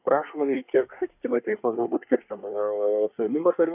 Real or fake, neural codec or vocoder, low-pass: fake; codec, 24 kHz, 1 kbps, SNAC; 3.6 kHz